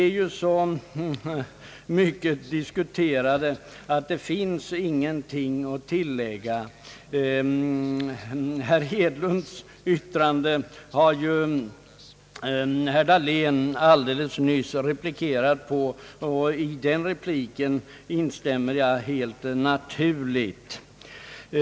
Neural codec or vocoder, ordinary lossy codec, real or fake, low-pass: none; none; real; none